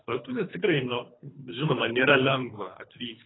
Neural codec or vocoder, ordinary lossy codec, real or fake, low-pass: codec, 24 kHz, 3 kbps, HILCodec; AAC, 16 kbps; fake; 7.2 kHz